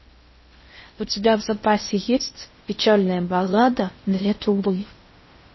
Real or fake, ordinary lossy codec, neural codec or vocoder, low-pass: fake; MP3, 24 kbps; codec, 16 kHz in and 24 kHz out, 0.6 kbps, FocalCodec, streaming, 2048 codes; 7.2 kHz